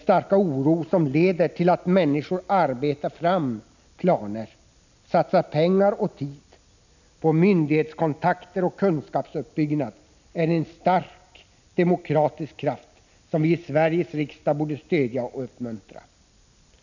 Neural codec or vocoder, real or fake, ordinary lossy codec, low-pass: none; real; none; 7.2 kHz